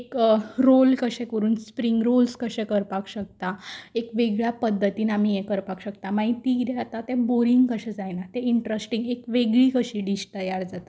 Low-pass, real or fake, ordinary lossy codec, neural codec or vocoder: none; real; none; none